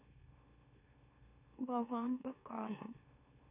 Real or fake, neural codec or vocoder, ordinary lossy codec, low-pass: fake; autoencoder, 44.1 kHz, a latent of 192 numbers a frame, MeloTTS; none; 3.6 kHz